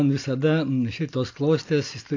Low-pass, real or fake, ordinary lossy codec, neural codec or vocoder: 7.2 kHz; real; AAC, 48 kbps; none